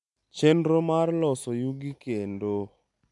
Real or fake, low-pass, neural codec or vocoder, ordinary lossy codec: real; 10.8 kHz; none; MP3, 96 kbps